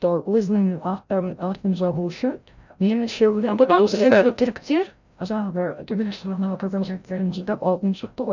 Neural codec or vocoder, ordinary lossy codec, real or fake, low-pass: codec, 16 kHz, 0.5 kbps, FreqCodec, larger model; none; fake; 7.2 kHz